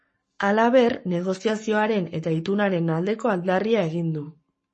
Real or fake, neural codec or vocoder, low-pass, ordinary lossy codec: fake; codec, 44.1 kHz, 7.8 kbps, Pupu-Codec; 10.8 kHz; MP3, 32 kbps